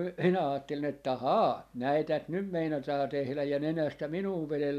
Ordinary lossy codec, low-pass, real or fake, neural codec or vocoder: none; 14.4 kHz; real; none